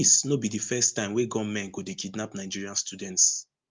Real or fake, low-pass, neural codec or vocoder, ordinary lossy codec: real; 7.2 kHz; none; Opus, 24 kbps